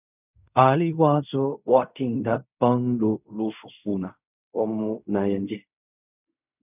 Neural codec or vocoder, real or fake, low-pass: codec, 16 kHz in and 24 kHz out, 0.4 kbps, LongCat-Audio-Codec, fine tuned four codebook decoder; fake; 3.6 kHz